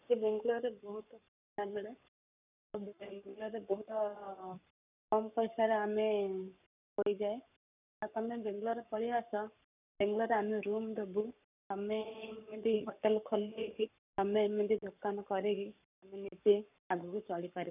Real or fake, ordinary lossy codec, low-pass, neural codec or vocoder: fake; none; 3.6 kHz; codec, 44.1 kHz, 7.8 kbps, Pupu-Codec